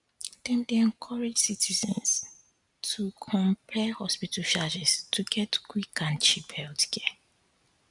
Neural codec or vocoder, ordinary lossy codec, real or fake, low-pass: none; none; real; 10.8 kHz